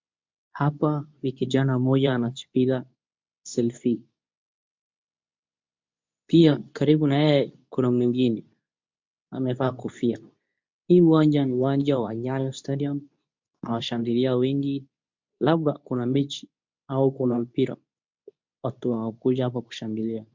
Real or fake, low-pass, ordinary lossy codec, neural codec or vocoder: fake; 7.2 kHz; MP3, 64 kbps; codec, 24 kHz, 0.9 kbps, WavTokenizer, medium speech release version 2